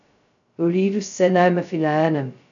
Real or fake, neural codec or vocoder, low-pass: fake; codec, 16 kHz, 0.2 kbps, FocalCodec; 7.2 kHz